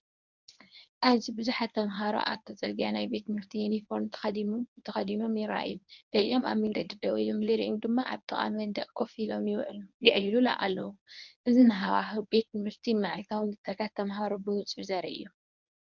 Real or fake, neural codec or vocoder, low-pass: fake; codec, 24 kHz, 0.9 kbps, WavTokenizer, medium speech release version 1; 7.2 kHz